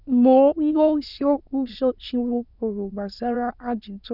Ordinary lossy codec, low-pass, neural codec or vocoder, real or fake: none; 5.4 kHz; autoencoder, 22.05 kHz, a latent of 192 numbers a frame, VITS, trained on many speakers; fake